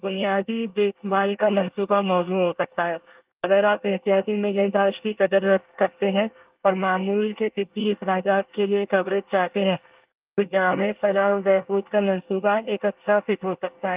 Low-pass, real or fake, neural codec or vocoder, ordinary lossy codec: 3.6 kHz; fake; codec, 24 kHz, 1 kbps, SNAC; Opus, 32 kbps